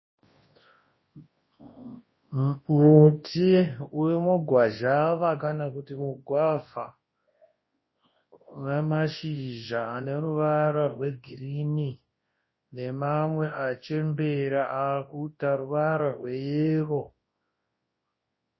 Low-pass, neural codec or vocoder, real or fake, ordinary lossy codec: 7.2 kHz; codec, 24 kHz, 0.9 kbps, WavTokenizer, large speech release; fake; MP3, 24 kbps